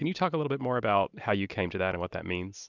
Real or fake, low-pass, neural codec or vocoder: real; 7.2 kHz; none